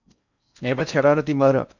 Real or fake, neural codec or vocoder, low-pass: fake; codec, 16 kHz in and 24 kHz out, 0.6 kbps, FocalCodec, streaming, 4096 codes; 7.2 kHz